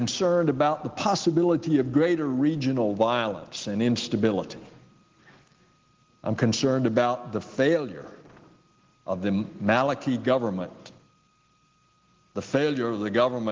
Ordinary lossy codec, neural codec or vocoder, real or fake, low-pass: Opus, 16 kbps; none; real; 7.2 kHz